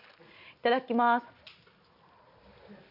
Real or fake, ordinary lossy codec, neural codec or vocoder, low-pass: real; none; none; 5.4 kHz